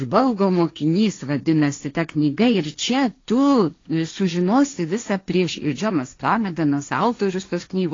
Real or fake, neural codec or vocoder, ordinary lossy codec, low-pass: fake; codec, 16 kHz, 1.1 kbps, Voila-Tokenizer; AAC, 32 kbps; 7.2 kHz